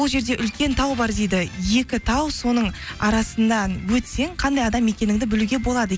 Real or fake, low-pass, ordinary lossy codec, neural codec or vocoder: real; none; none; none